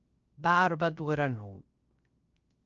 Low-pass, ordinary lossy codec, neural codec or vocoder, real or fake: 7.2 kHz; Opus, 24 kbps; codec, 16 kHz, 0.3 kbps, FocalCodec; fake